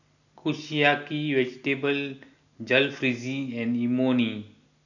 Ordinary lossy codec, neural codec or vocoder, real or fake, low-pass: none; none; real; 7.2 kHz